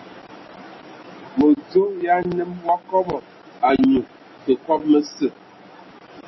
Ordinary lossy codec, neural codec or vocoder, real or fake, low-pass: MP3, 24 kbps; none; real; 7.2 kHz